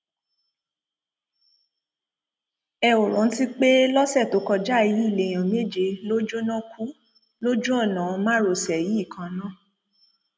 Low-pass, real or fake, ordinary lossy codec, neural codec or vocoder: none; real; none; none